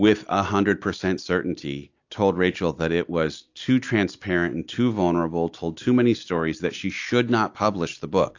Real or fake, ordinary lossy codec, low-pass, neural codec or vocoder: real; AAC, 48 kbps; 7.2 kHz; none